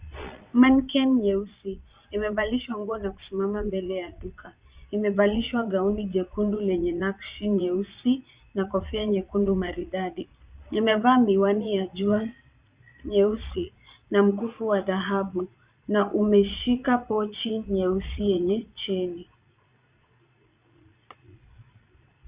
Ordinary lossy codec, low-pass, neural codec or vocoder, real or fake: Opus, 64 kbps; 3.6 kHz; vocoder, 22.05 kHz, 80 mel bands, Vocos; fake